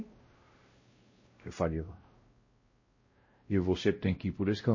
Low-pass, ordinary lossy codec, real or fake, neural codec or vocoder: 7.2 kHz; MP3, 32 kbps; fake; codec, 16 kHz, 0.5 kbps, X-Codec, WavLM features, trained on Multilingual LibriSpeech